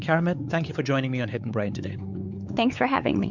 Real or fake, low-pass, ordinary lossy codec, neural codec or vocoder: fake; 7.2 kHz; Opus, 64 kbps; codec, 16 kHz, 4 kbps, X-Codec, WavLM features, trained on Multilingual LibriSpeech